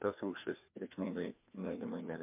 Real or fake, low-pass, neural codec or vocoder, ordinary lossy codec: fake; 3.6 kHz; codec, 16 kHz, 2 kbps, FreqCodec, larger model; MP3, 32 kbps